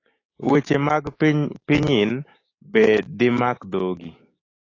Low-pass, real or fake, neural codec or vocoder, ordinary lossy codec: 7.2 kHz; fake; codec, 16 kHz, 6 kbps, DAC; AAC, 32 kbps